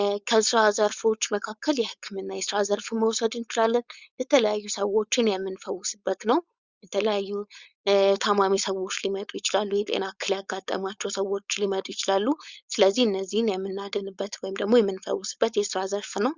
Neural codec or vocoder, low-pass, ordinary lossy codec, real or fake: codec, 16 kHz, 4.8 kbps, FACodec; 7.2 kHz; Opus, 64 kbps; fake